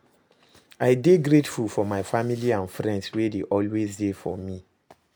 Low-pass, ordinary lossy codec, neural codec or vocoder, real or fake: none; none; none; real